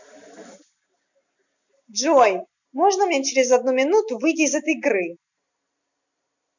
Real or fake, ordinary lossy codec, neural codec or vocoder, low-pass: real; none; none; 7.2 kHz